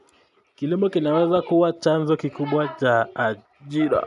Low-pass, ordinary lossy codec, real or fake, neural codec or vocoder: 10.8 kHz; none; real; none